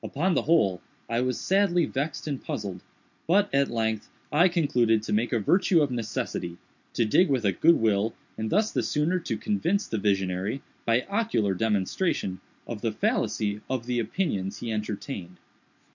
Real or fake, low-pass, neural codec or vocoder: real; 7.2 kHz; none